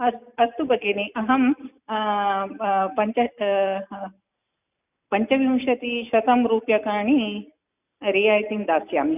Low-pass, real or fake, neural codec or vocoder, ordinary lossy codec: 3.6 kHz; real; none; none